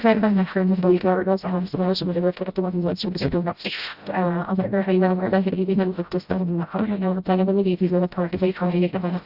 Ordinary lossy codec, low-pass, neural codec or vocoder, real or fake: Opus, 64 kbps; 5.4 kHz; codec, 16 kHz, 0.5 kbps, FreqCodec, smaller model; fake